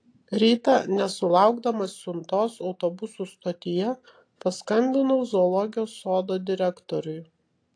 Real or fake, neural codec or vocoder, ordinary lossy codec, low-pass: real; none; AAC, 48 kbps; 9.9 kHz